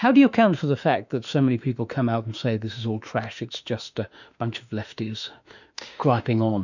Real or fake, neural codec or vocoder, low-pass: fake; autoencoder, 48 kHz, 32 numbers a frame, DAC-VAE, trained on Japanese speech; 7.2 kHz